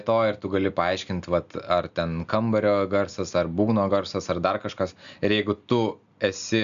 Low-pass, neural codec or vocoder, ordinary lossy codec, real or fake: 7.2 kHz; none; AAC, 96 kbps; real